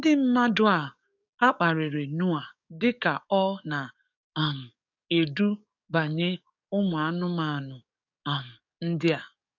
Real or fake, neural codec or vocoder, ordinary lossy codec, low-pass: fake; codec, 44.1 kHz, 7.8 kbps, DAC; none; 7.2 kHz